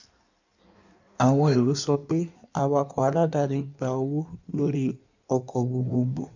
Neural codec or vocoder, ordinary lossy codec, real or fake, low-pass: codec, 16 kHz in and 24 kHz out, 1.1 kbps, FireRedTTS-2 codec; none; fake; 7.2 kHz